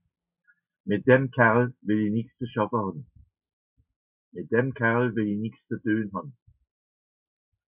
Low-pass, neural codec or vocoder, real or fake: 3.6 kHz; none; real